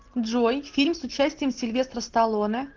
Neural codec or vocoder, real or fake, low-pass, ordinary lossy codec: none; real; 7.2 kHz; Opus, 16 kbps